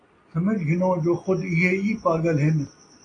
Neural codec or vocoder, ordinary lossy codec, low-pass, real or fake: none; AAC, 32 kbps; 9.9 kHz; real